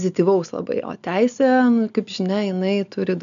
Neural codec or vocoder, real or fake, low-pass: none; real; 7.2 kHz